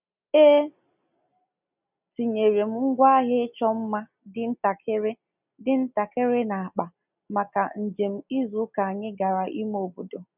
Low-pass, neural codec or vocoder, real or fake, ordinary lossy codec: 3.6 kHz; none; real; none